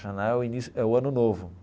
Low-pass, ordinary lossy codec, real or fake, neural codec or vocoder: none; none; real; none